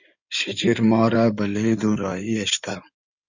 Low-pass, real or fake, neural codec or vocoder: 7.2 kHz; fake; codec, 16 kHz in and 24 kHz out, 2.2 kbps, FireRedTTS-2 codec